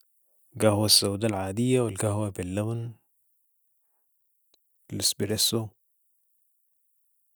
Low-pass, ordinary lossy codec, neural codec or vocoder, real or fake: none; none; none; real